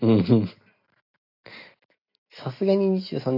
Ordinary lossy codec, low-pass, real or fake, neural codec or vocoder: AAC, 32 kbps; 5.4 kHz; real; none